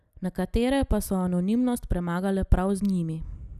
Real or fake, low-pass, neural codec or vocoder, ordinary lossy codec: real; 14.4 kHz; none; none